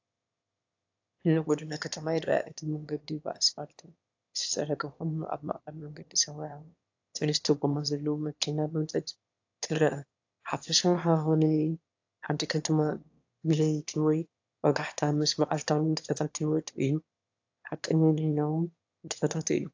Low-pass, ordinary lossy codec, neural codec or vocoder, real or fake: 7.2 kHz; AAC, 48 kbps; autoencoder, 22.05 kHz, a latent of 192 numbers a frame, VITS, trained on one speaker; fake